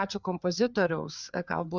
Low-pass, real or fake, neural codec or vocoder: 7.2 kHz; fake; codec, 16 kHz, 4 kbps, FreqCodec, larger model